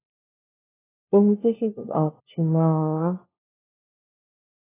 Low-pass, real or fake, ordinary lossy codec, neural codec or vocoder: 3.6 kHz; fake; AAC, 16 kbps; codec, 16 kHz, 1 kbps, FunCodec, trained on LibriTTS, 50 frames a second